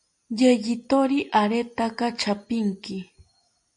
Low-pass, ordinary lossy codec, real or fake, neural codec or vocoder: 9.9 kHz; MP3, 64 kbps; real; none